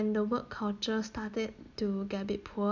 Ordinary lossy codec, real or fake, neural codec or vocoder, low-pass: none; real; none; 7.2 kHz